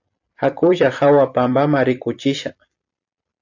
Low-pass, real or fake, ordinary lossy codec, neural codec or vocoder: 7.2 kHz; fake; AAC, 48 kbps; vocoder, 44.1 kHz, 128 mel bands every 256 samples, BigVGAN v2